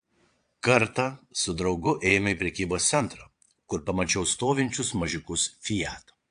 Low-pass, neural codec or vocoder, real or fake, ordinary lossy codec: 9.9 kHz; vocoder, 22.05 kHz, 80 mel bands, Vocos; fake; AAC, 64 kbps